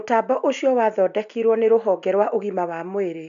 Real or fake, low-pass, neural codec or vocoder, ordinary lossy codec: real; 7.2 kHz; none; none